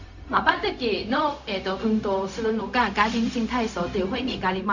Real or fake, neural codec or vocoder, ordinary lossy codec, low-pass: fake; codec, 16 kHz, 0.4 kbps, LongCat-Audio-Codec; none; 7.2 kHz